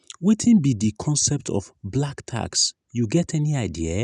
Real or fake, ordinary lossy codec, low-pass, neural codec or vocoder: real; none; 10.8 kHz; none